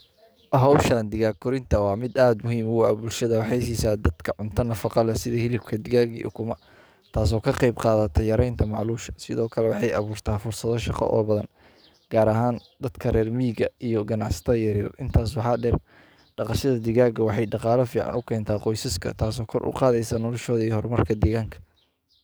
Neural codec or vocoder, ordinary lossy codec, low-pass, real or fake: codec, 44.1 kHz, 7.8 kbps, DAC; none; none; fake